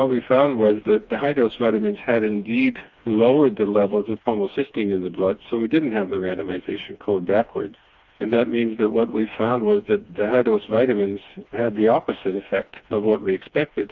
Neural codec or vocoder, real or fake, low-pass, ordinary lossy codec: codec, 16 kHz, 2 kbps, FreqCodec, smaller model; fake; 7.2 kHz; Opus, 64 kbps